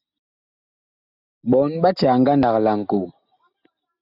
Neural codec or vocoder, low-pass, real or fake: none; 5.4 kHz; real